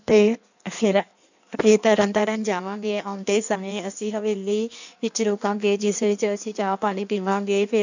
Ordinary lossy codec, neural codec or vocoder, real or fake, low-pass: none; codec, 16 kHz in and 24 kHz out, 1.1 kbps, FireRedTTS-2 codec; fake; 7.2 kHz